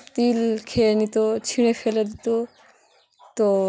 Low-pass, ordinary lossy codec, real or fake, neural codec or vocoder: none; none; real; none